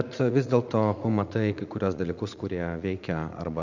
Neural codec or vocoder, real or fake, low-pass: vocoder, 44.1 kHz, 80 mel bands, Vocos; fake; 7.2 kHz